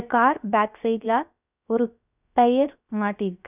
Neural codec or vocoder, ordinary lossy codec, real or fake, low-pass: codec, 16 kHz, about 1 kbps, DyCAST, with the encoder's durations; none; fake; 3.6 kHz